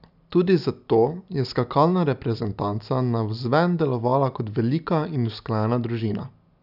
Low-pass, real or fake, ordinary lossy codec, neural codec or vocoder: 5.4 kHz; real; none; none